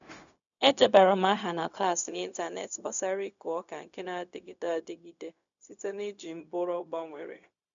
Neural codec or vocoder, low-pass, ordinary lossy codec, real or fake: codec, 16 kHz, 0.4 kbps, LongCat-Audio-Codec; 7.2 kHz; none; fake